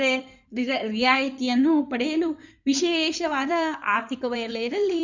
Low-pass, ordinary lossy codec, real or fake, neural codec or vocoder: 7.2 kHz; none; fake; codec, 16 kHz in and 24 kHz out, 2.2 kbps, FireRedTTS-2 codec